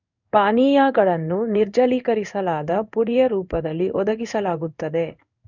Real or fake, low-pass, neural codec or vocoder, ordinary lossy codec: fake; 7.2 kHz; codec, 16 kHz in and 24 kHz out, 1 kbps, XY-Tokenizer; none